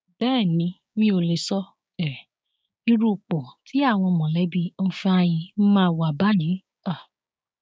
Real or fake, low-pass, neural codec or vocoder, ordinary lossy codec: fake; none; codec, 16 kHz, 6 kbps, DAC; none